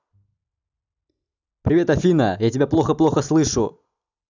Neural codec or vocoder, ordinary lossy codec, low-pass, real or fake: none; none; 7.2 kHz; real